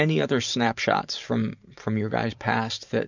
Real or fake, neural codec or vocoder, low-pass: fake; vocoder, 44.1 kHz, 128 mel bands every 512 samples, BigVGAN v2; 7.2 kHz